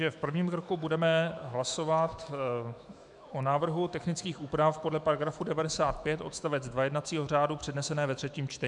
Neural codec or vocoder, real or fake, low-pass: autoencoder, 48 kHz, 128 numbers a frame, DAC-VAE, trained on Japanese speech; fake; 10.8 kHz